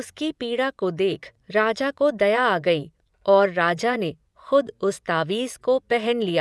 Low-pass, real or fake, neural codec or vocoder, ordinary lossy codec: none; fake; vocoder, 24 kHz, 100 mel bands, Vocos; none